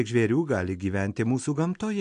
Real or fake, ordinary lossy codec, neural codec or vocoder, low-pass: real; MP3, 64 kbps; none; 9.9 kHz